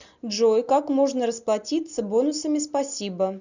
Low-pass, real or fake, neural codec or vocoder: 7.2 kHz; real; none